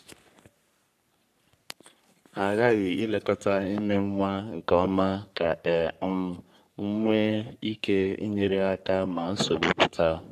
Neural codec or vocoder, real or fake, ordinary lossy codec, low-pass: codec, 44.1 kHz, 3.4 kbps, Pupu-Codec; fake; AAC, 96 kbps; 14.4 kHz